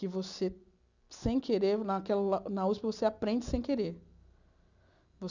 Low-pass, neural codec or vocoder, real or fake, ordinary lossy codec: 7.2 kHz; none; real; none